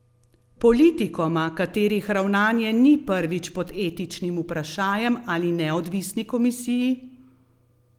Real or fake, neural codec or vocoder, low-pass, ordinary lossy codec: real; none; 19.8 kHz; Opus, 24 kbps